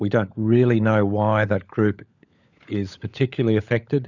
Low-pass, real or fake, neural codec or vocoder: 7.2 kHz; fake; codec, 16 kHz, 16 kbps, FunCodec, trained on LibriTTS, 50 frames a second